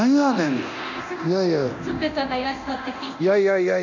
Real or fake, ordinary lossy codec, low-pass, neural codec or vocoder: fake; none; 7.2 kHz; codec, 24 kHz, 0.9 kbps, DualCodec